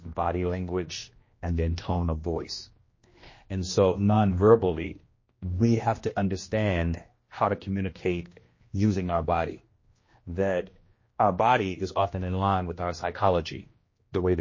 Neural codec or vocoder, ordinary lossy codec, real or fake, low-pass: codec, 16 kHz, 1 kbps, X-Codec, HuBERT features, trained on general audio; MP3, 32 kbps; fake; 7.2 kHz